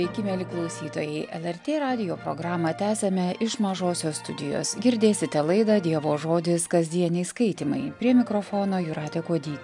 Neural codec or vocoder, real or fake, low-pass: none; real; 10.8 kHz